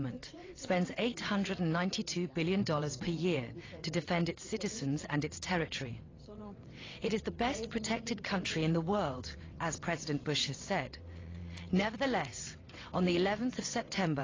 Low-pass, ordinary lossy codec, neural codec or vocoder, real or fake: 7.2 kHz; AAC, 32 kbps; vocoder, 22.05 kHz, 80 mel bands, WaveNeXt; fake